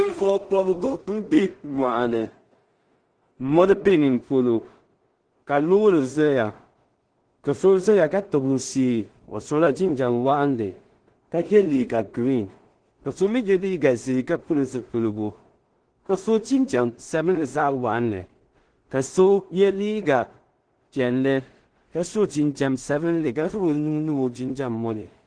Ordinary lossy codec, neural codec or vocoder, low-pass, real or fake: Opus, 16 kbps; codec, 16 kHz in and 24 kHz out, 0.4 kbps, LongCat-Audio-Codec, two codebook decoder; 9.9 kHz; fake